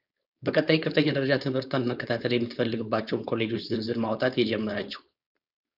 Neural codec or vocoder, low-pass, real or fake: codec, 16 kHz, 4.8 kbps, FACodec; 5.4 kHz; fake